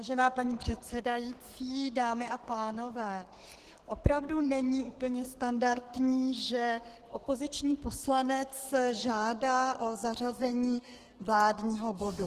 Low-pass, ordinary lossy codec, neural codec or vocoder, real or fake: 14.4 kHz; Opus, 16 kbps; codec, 32 kHz, 1.9 kbps, SNAC; fake